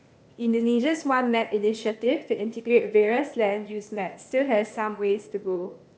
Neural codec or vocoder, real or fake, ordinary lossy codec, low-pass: codec, 16 kHz, 0.8 kbps, ZipCodec; fake; none; none